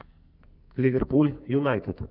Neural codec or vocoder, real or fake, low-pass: codec, 44.1 kHz, 2.6 kbps, SNAC; fake; 5.4 kHz